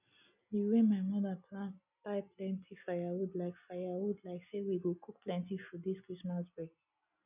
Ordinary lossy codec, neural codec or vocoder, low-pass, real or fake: none; none; 3.6 kHz; real